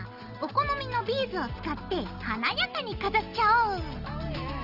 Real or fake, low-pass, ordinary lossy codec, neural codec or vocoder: real; 5.4 kHz; Opus, 24 kbps; none